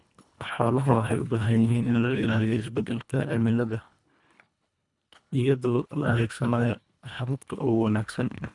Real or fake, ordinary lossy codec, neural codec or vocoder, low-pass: fake; none; codec, 24 kHz, 1.5 kbps, HILCodec; 10.8 kHz